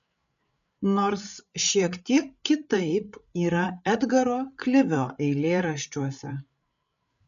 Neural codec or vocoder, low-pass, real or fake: codec, 16 kHz, 8 kbps, FreqCodec, larger model; 7.2 kHz; fake